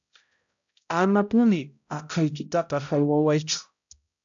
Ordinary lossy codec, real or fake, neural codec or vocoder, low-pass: AAC, 64 kbps; fake; codec, 16 kHz, 0.5 kbps, X-Codec, HuBERT features, trained on balanced general audio; 7.2 kHz